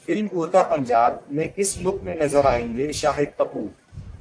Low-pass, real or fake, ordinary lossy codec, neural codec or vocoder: 9.9 kHz; fake; AAC, 64 kbps; codec, 44.1 kHz, 1.7 kbps, Pupu-Codec